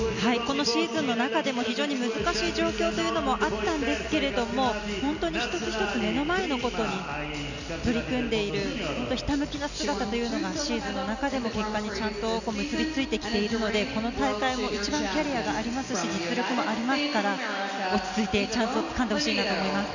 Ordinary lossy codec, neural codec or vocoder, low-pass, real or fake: none; none; 7.2 kHz; real